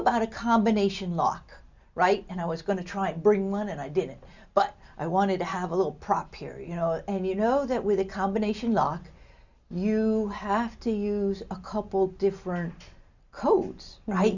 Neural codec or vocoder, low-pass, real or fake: none; 7.2 kHz; real